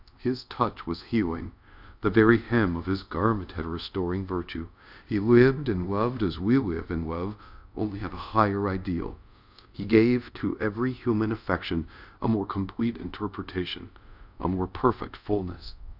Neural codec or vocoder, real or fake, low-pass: codec, 24 kHz, 0.5 kbps, DualCodec; fake; 5.4 kHz